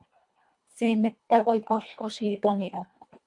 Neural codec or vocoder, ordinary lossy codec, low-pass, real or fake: codec, 24 kHz, 1.5 kbps, HILCodec; MP3, 64 kbps; 10.8 kHz; fake